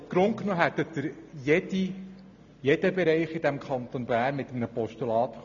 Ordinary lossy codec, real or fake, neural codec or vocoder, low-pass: none; real; none; 7.2 kHz